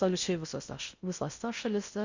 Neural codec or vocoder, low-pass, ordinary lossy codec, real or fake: codec, 16 kHz in and 24 kHz out, 0.6 kbps, FocalCodec, streaming, 4096 codes; 7.2 kHz; Opus, 64 kbps; fake